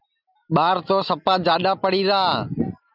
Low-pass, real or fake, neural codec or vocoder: 5.4 kHz; real; none